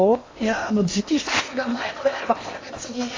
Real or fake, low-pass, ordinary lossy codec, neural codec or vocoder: fake; 7.2 kHz; AAC, 32 kbps; codec, 16 kHz in and 24 kHz out, 0.8 kbps, FocalCodec, streaming, 65536 codes